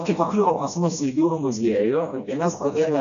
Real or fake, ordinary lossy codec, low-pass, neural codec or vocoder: fake; AAC, 96 kbps; 7.2 kHz; codec, 16 kHz, 1 kbps, FreqCodec, smaller model